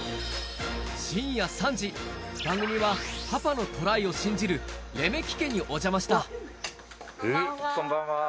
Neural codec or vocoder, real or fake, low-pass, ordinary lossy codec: none; real; none; none